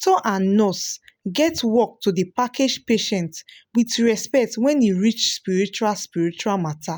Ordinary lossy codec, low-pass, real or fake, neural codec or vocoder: none; none; real; none